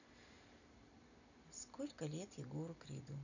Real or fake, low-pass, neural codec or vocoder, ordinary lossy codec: real; 7.2 kHz; none; none